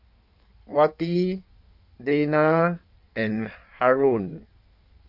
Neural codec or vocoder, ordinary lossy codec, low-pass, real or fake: codec, 16 kHz in and 24 kHz out, 1.1 kbps, FireRedTTS-2 codec; none; 5.4 kHz; fake